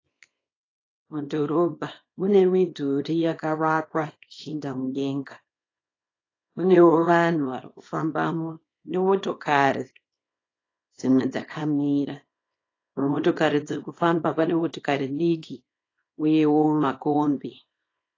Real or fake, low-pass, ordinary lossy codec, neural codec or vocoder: fake; 7.2 kHz; AAC, 32 kbps; codec, 24 kHz, 0.9 kbps, WavTokenizer, small release